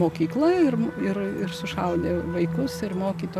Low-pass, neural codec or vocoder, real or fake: 14.4 kHz; none; real